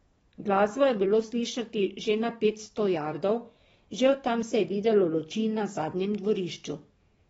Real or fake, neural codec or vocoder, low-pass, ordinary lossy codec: fake; codec, 44.1 kHz, 7.8 kbps, DAC; 19.8 kHz; AAC, 24 kbps